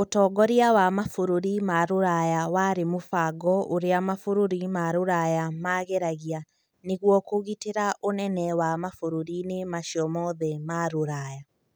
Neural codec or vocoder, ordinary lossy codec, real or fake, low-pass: none; none; real; none